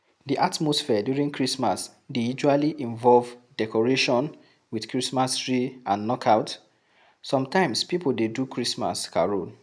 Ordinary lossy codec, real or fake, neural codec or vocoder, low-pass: none; real; none; none